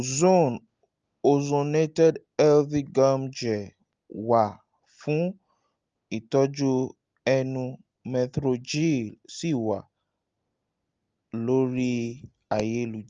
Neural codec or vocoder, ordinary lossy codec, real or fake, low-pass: none; Opus, 24 kbps; real; 7.2 kHz